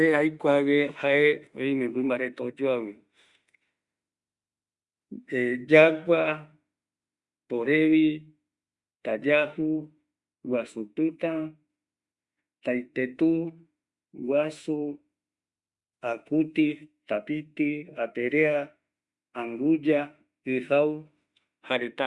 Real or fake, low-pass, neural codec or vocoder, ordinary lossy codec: fake; 10.8 kHz; autoencoder, 48 kHz, 32 numbers a frame, DAC-VAE, trained on Japanese speech; Opus, 64 kbps